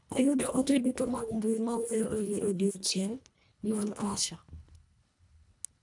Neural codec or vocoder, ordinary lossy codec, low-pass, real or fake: codec, 24 kHz, 1.5 kbps, HILCodec; none; 10.8 kHz; fake